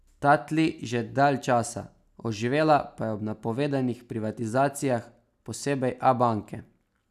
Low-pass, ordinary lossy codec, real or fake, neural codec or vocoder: 14.4 kHz; none; real; none